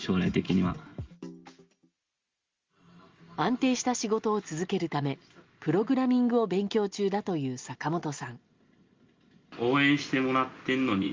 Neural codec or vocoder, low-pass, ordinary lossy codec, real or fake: none; 7.2 kHz; Opus, 32 kbps; real